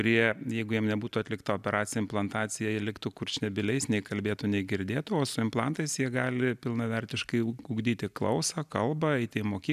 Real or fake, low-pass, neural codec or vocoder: real; 14.4 kHz; none